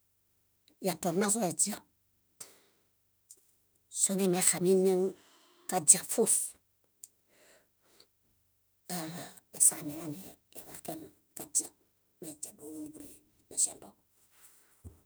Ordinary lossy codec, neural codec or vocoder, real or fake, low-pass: none; autoencoder, 48 kHz, 32 numbers a frame, DAC-VAE, trained on Japanese speech; fake; none